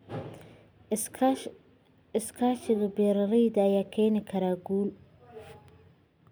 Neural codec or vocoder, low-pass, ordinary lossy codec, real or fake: none; none; none; real